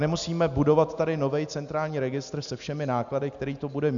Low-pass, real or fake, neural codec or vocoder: 7.2 kHz; real; none